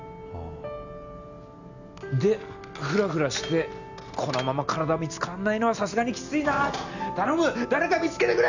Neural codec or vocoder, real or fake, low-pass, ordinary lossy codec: none; real; 7.2 kHz; none